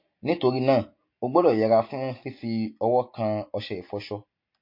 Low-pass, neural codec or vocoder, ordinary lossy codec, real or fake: 5.4 kHz; none; MP3, 32 kbps; real